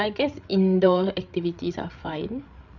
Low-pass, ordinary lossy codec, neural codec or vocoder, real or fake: 7.2 kHz; none; codec, 16 kHz, 8 kbps, FreqCodec, larger model; fake